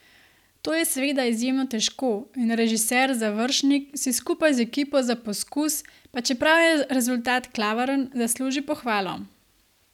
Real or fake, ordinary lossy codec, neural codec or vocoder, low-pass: real; none; none; 19.8 kHz